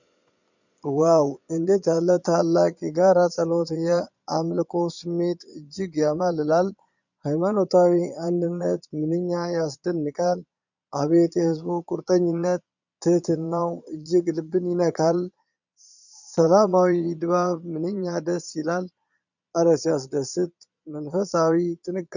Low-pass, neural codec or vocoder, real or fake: 7.2 kHz; vocoder, 22.05 kHz, 80 mel bands, Vocos; fake